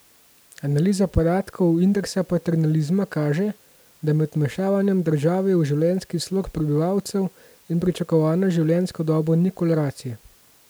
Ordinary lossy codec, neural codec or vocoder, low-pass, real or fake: none; none; none; real